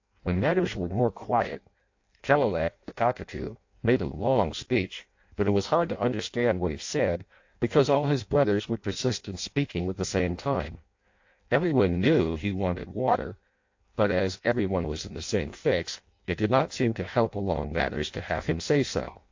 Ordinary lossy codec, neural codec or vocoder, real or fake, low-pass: AAC, 48 kbps; codec, 16 kHz in and 24 kHz out, 0.6 kbps, FireRedTTS-2 codec; fake; 7.2 kHz